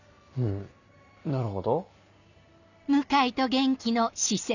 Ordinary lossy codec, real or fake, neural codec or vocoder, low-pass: none; real; none; 7.2 kHz